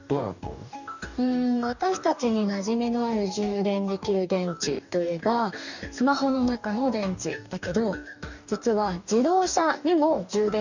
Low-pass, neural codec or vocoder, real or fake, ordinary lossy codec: 7.2 kHz; codec, 44.1 kHz, 2.6 kbps, DAC; fake; none